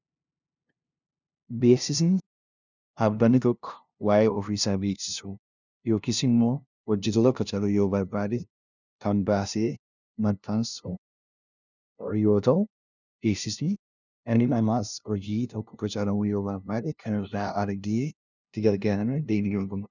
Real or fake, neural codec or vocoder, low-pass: fake; codec, 16 kHz, 0.5 kbps, FunCodec, trained on LibriTTS, 25 frames a second; 7.2 kHz